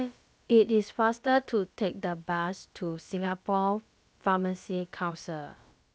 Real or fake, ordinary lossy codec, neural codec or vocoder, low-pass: fake; none; codec, 16 kHz, about 1 kbps, DyCAST, with the encoder's durations; none